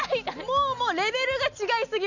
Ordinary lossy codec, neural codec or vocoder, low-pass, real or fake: none; none; 7.2 kHz; real